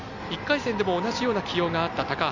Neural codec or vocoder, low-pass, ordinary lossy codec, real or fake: none; 7.2 kHz; none; real